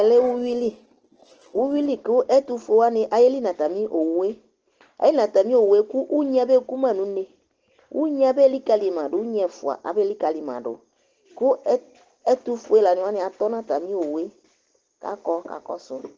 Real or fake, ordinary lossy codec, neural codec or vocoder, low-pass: real; Opus, 16 kbps; none; 7.2 kHz